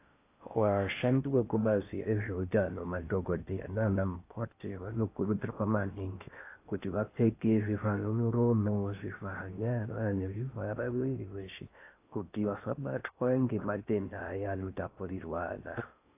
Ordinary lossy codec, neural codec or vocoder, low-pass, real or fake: AAC, 24 kbps; codec, 16 kHz in and 24 kHz out, 0.6 kbps, FocalCodec, streaming, 4096 codes; 3.6 kHz; fake